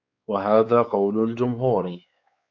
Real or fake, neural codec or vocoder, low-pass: fake; codec, 16 kHz, 4 kbps, X-Codec, WavLM features, trained on Multilingual LibriSpeech; 7.2 kHz